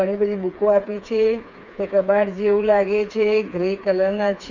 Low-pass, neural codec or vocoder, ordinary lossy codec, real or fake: 7.2 kHz; codec, 16 kHz, 4 kbps, FreqCodec, smaller model; none; fake